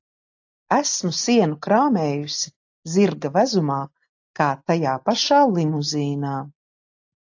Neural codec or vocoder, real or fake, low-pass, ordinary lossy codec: none; real; 7.2 kHz; AAC, 48 kbps